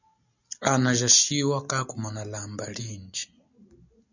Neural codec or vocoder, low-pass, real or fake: none; 7.2 kHz; real